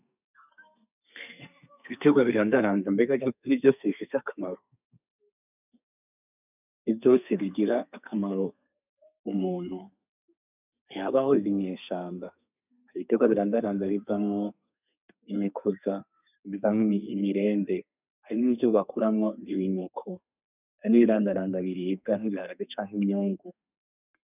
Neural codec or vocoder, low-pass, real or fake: codec, 32 kHz, 1.9 kbps, SNAC; 3.6 kHz; fake